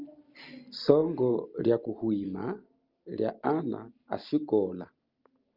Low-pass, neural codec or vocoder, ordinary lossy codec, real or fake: 5.4 kHz; none; Opus, 32 kbps; real